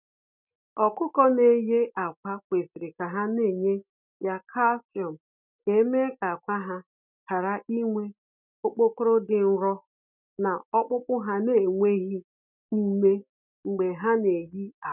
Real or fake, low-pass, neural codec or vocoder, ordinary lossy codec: real; 3.6 kHz; none; none